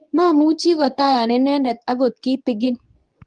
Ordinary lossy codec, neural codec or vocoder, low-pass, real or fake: Opus, 32 kbps; codec, 24 kHz, 0.9 kbps, WavTokenizer, medium speech release version 2; 9.9 kHz; fake